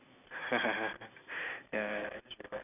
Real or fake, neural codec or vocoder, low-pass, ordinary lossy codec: real; none; 3.6 kHz; none